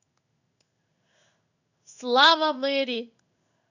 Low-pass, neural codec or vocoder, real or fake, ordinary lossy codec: 7.2 kHz; codec, 16 kHz in and 24 kHz out, 1 kbps, XY-Tokenizer; fake; none